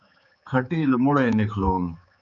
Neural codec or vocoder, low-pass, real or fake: codec, 16 kHz, 4 kbps, X-Codec, HuBERT features, trained on general audio; 7.2 kHz; fake